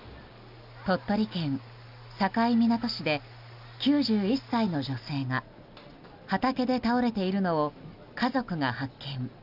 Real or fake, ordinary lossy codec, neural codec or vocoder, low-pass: real; none; none; 5.4 kHz